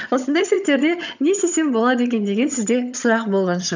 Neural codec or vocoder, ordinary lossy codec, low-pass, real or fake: vocoder, 22.05 kHz, 80 mel bands, HiFi-GAN; none; 7.2 kHz; fake